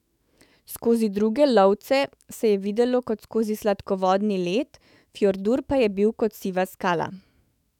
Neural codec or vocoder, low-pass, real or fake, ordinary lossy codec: autoencoder, 48 kHz, 128 numbers a frame, DAC-VAE, trained on Japanese speech; 19.8 kHz; fake; none